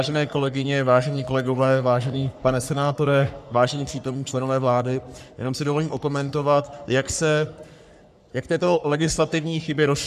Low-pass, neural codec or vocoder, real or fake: 14.4 kHz; codec, 44.1 kHz, 3.4 kbps, Pupu-Codec; fake